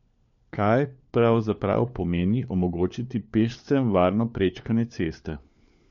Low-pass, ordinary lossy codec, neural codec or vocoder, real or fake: 7.2 kHz; MP3, 48 kbps; codec, 16 kHz, 4 kbps, FunCodec, trained on LibriTTS, 50 frames a second; fake